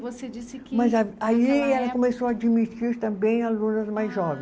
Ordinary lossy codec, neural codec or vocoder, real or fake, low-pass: none; none; real; none